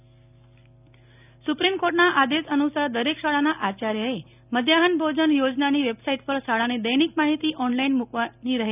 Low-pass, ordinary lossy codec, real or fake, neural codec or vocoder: 3.6 kHz; none; real; none